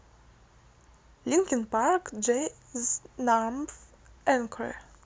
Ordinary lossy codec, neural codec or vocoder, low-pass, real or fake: none; none; none; real